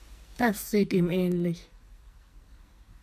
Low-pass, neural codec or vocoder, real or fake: 14.4 kHz; codec, 44.1 kHz, 2.6 kbps, SNAC; fake